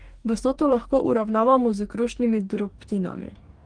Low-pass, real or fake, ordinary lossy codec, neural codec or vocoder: 9.9 kHz; fake; Opus, 24 kbps; codec, 44.1 kHz, 2.6 kbps, DAC